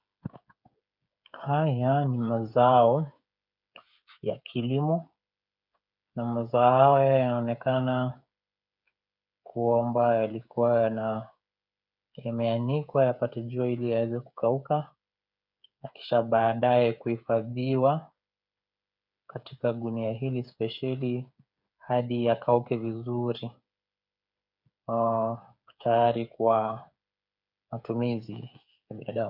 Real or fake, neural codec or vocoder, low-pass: fake; codec, 16 kHz, 8 kbps, FreqCodec, smaller model; 5.4 kHz